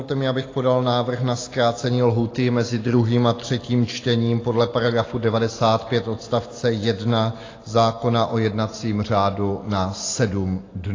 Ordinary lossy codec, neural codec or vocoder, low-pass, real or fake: AAC, 32 kbps; none; 7.2 kHz; real